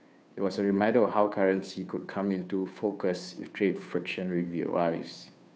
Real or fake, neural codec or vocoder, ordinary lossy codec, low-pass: fake; codec, 16 kHz, 2 kbps, FunCodec, trained on Chinese and English, 25 frames a second; none; none